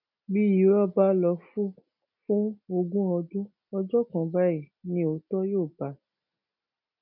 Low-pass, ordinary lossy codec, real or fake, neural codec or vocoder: 5.4 kHz; none; real; none